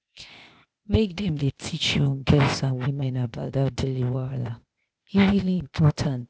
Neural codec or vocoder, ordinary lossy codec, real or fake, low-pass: codec, 16 kHz, 0.8 kbps, ZipCodec; none; fake; none